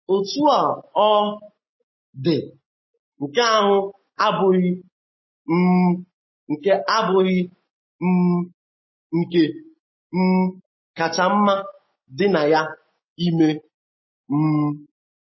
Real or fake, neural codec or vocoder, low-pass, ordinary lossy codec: real; none; 7.2 kHz; MP3, 24 kbps